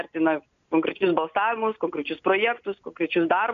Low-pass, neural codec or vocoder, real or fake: 7.2 kHz; none; real